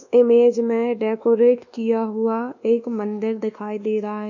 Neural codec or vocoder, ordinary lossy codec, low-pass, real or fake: codec, 24 kHz, 1.2 kbps, DualCodec; none; 7.2 kHz; fake